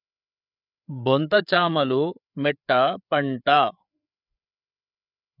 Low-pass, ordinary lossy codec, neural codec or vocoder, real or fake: 5.4 kHz; none; codec, 16 kHz, 8 kbps, FreqCodec, larger model; fake